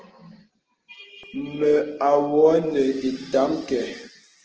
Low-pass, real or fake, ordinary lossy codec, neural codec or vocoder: 7.2 kHz; real; Opus, 16 kbps; none